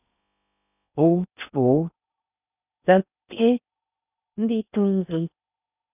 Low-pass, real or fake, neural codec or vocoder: 3.6 kHz; fake; codec, 16 kHz in and 24 kHz out, 0.6 kbps, FocalCodec, streaming, 4096 codes